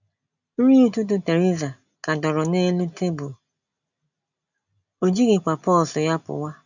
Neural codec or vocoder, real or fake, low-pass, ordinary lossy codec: none; real; 7.2 kHz; none